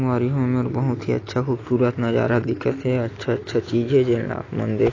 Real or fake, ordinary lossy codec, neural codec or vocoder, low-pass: real; AAC, 32 kbps; none; 7.2 kHz